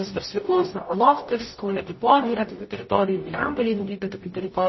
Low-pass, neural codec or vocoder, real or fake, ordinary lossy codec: 7.2 kHz; codec, 44.1 kHz, 0.9 kbps, DAC; fake; MP3, 24 kbps